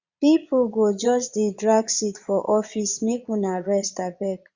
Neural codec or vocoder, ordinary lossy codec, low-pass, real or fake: vocoder, 22.05 kHz, 80 mel bands, Vocos; Opus, 64 kbps; 7.2 kHz; fake